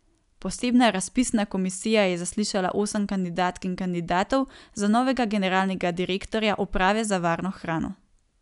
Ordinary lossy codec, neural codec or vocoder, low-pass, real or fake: none; none; 10.8 kHz; real